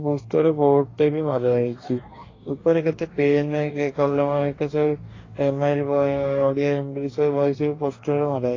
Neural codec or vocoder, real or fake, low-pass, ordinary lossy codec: codec, 44.1 kHz, 2.6 kbps, DAC; fake; 7.2 kHz; AAC, 32 kbps